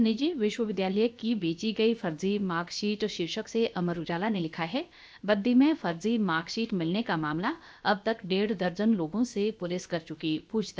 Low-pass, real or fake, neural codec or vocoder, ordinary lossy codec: none; fake; codec, 16 kHz, about 1 kbps, DyCAST, with the encoder's durations; none